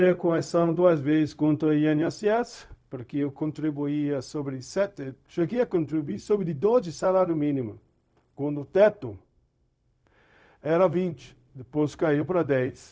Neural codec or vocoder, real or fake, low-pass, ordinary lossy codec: codec, 16 kHz, 0.4 kbps, LongCat-Audio-Codec; fake; none; none